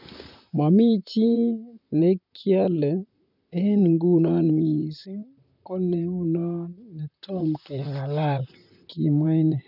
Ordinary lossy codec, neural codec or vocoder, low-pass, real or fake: none; vocoder, 44.1 kHz, 128 mel bands, Pupu-Vocoder; 5.4 kHz; fake